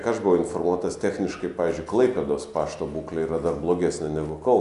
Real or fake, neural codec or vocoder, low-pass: real; none; 10.8 kHz